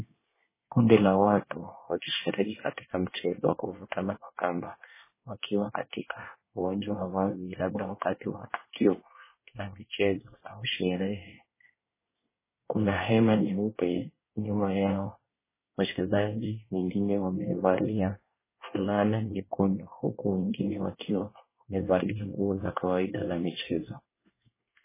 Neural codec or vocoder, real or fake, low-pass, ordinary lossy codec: codec, 24 kHz, 1 kbps, SNAC; fake; 3.6 kHz; MP3, 16 kbps